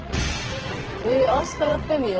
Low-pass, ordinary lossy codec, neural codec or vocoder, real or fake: 7.2 kHz; Opus, 16 kbps; vocoder, 22.05 kHz, 80 mel bands, WaveNeXt; fake